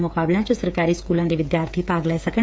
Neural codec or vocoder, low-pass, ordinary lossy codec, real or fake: codec, 16 kHz, 8 kbps, FreqCodec, smaller model; none; none; fake